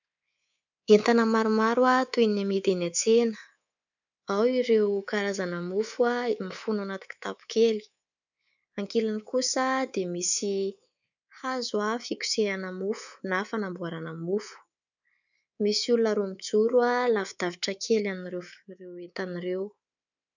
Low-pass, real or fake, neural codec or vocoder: 7.2 kHz; fake; codec, 24 kHz, 3.1 kbps, DualCodec